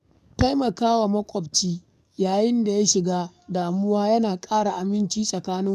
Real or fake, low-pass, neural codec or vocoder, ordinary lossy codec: fake; 14.4 kHz; codec, 44.1 kHz, 7.8 kbps, DAC; none